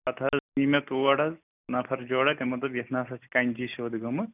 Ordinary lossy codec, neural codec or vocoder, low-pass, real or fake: none; none; 3.6 kHz; real